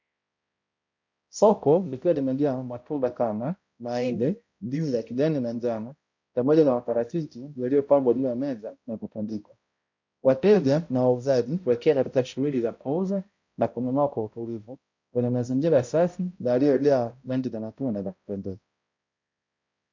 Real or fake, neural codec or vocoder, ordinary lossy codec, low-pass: fake; codec, 16 kHz, 0.5 kbps, X-Codec, HuBERT features, trained on balanced general audio; MP3, 48 kbps; 7.2 kHz